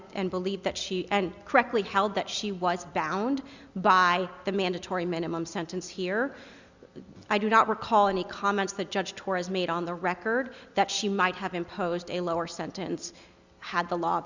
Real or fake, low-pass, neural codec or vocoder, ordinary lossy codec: real; 7.2 kHz; none; Opus, 64 kbps